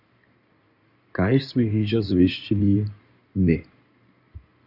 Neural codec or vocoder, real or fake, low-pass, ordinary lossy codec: codec, 16 kHz in and 24 kHz out, 2.2 kbps, FireRedTTS-2 codec; fake; 5.4 kHz; AAC, 48 kbps